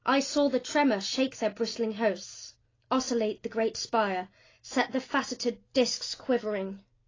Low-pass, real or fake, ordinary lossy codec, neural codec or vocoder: 7.2 kHz; real; AAC, 32 kbps; none